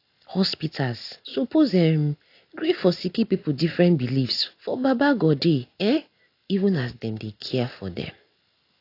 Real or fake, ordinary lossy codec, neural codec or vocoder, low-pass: real; AAC, 32 kbps; none; 5.4 kHz